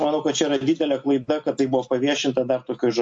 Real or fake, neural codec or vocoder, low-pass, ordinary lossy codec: real; none; 10.8 kHz; MP3, 48 kbps